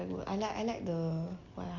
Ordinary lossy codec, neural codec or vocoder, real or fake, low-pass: none; none; real; 7.2 kHz